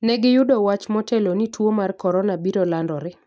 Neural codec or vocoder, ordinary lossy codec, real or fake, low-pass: none; none; real; none